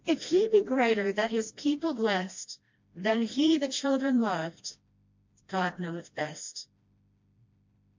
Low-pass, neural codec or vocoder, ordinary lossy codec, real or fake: 7.2 kHz; codec, 16 kHz, 1 kbps, FreqCodec, smaller model; MP3, 48 kbps; fake